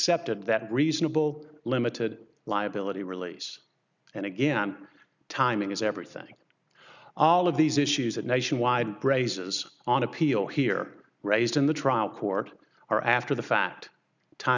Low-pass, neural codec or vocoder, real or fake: 7.2 kHz; none; real